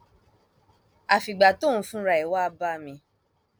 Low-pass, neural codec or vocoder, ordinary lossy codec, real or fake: none; none; none; real